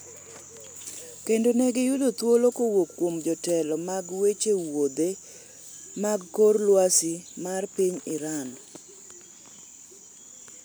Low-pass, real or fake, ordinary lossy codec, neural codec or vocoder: none; real; none; none